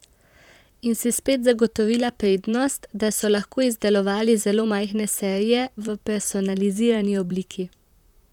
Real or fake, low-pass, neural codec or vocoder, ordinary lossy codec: fake; 19.8 kHz; vocoder, 44.1 kHz, 128 mel bands, Pupu-Vocoder; none